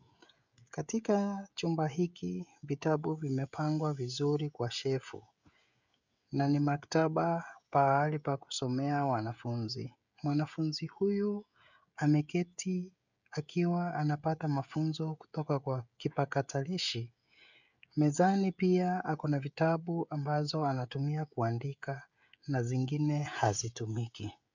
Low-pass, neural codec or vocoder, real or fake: 7.2 kHz; codec, 16 kHz, 16 kbps, FreqCodec, smaller model; fake